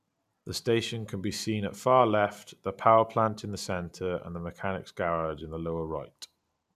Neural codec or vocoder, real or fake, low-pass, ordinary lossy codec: none; real; 14.4 kHz; none